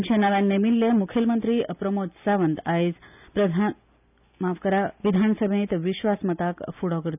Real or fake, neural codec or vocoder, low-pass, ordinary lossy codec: real; none; 3.6 kHz; none